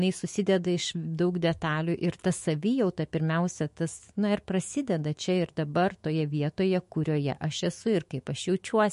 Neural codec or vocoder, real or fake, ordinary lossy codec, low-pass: autoencoder, 48 kHz, 128 numbers a frame, DAC-VAE, trained on Japanese speech; fake; MP3, 48 kbps; 14.4 kHz